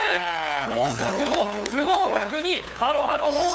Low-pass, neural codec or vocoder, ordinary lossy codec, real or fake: none; codec, 16 kHz, 2 kbps, FunCodec, trained on LibriTTS, 25 frames a second; none; fake